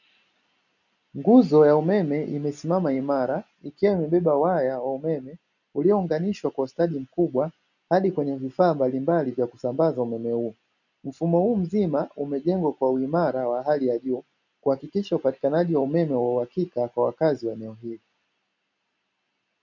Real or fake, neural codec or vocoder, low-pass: real; none; 7.2 kHz